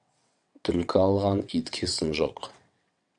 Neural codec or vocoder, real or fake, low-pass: vocoder, 22.05 kHz, 80 mel bands, WaveNeXt; fake; 9.9 kHz